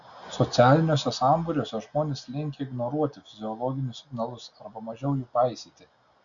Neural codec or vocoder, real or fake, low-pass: none; real; 7.2 kHz